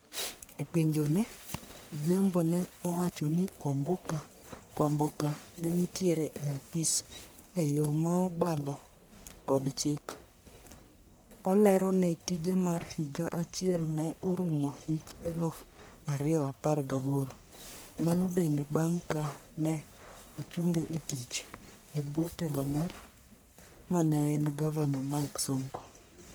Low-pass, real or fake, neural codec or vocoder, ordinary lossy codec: none; fake; codec, 44.1 kHz, 1.7 kbps, Pupu-Codec; none